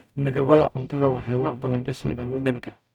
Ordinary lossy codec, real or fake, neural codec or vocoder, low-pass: none; fake; codec, 44.1 kHz, 0.9 kbps, DAC; 19.8 kHz